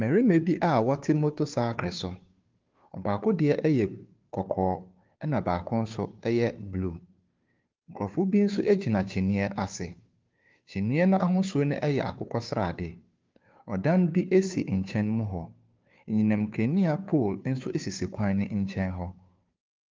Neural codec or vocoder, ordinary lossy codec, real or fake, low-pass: codec, 16 kHz, 2 kbps, FunCodec, trained on Chinese and English, 25 frames a second; Opus, 32 kbps; fake; 7.2 kHz